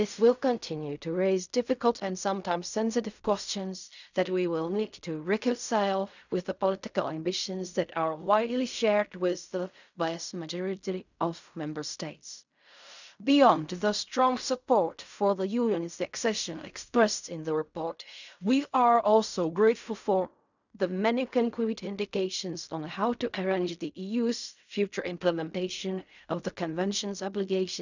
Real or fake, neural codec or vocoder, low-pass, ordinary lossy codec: fake; codec, 16 kHz in and 24 kHz out, 0.4 kbps, LongCat-Audio-Codec, fine tuned four codebook decoder; 7.2 kHz; none